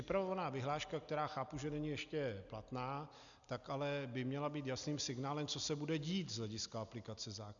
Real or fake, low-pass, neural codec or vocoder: real; 7.2 kHz; none